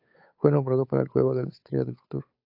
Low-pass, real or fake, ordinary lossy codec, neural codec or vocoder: 5.4 kHz; fake; AAC, 32 kbps; codec, 16 kHz, 8 kbps, FunCodec, trained on Chinese and English, 25 frames a second